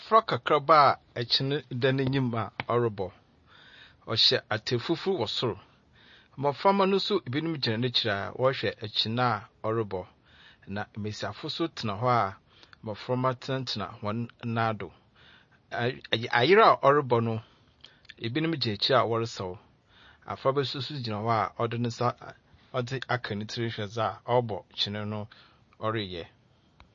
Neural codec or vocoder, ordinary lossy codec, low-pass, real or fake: none; MP3, 32 kbps; 7.2 kHz; real